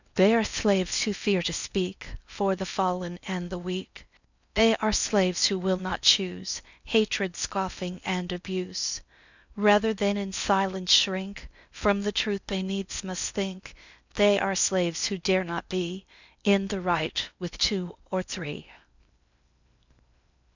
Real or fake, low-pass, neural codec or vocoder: fake; 7.2 kHz; codec, 16 kHz in and 24 kHz out, 0.8 kbps, FocalCodec, streaming, 65536 codes